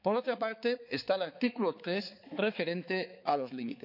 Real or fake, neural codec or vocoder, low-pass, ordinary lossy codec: fake; codec, 16 kHz, 4 kbps, X-Codec, HuBERT features, trained on balanced general audio; 5.4 kHz; none